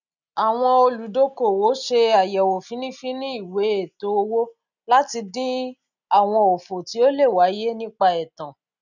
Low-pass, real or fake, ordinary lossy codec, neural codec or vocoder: 7.2 kHz; real; none; none